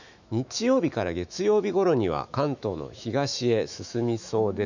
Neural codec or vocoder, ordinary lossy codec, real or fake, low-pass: autoencoder, 48 kHz, 128 numbers a frame, DAC-VAE, trained on Japanese speech; none; fake; 7.2 kHz